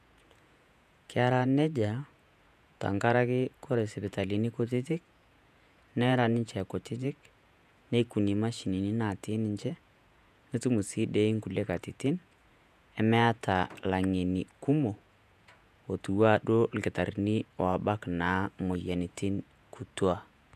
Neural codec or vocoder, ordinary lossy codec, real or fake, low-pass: vocoder, 48 kHz, 128 mel bands, Vocos; none; fake; 14.4 kHz